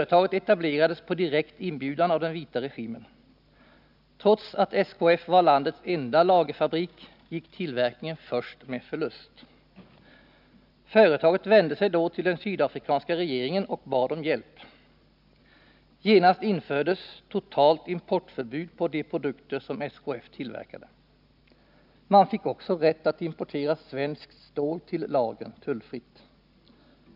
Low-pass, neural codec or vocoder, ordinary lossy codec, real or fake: 5.4 kHz; none; none; real